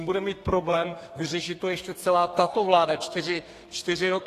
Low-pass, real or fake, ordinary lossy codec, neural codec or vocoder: 14.4 kHz; fake; AAC, 48 kbps; codec, 32 kHz, 1.9 kbps, SNAC